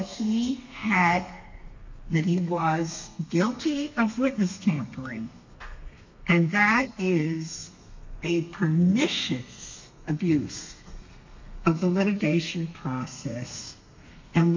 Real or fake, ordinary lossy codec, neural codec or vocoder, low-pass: fake; MP3, 48 kbps; codec, 32 kHz, 1.9 kbps, SNAC; 7.2 kHz